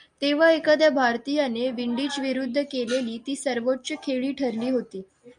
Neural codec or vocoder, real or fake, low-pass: none; real; 9.9 kHz